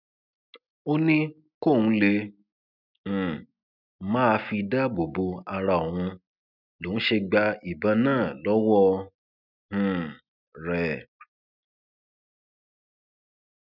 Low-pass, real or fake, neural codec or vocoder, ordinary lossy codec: 5.4 kHz; real; none; none